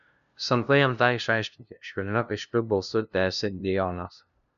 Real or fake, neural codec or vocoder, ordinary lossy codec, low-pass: fake; codec, 16 kHz, 0.5 kbps, FunCodec, trained on LibriTTS, 25 frames a second; MP3, 96 kbps; 7.2 kHz